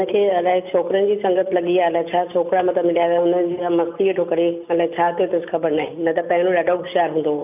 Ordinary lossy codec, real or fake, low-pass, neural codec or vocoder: none; real; 3.6 kHz; none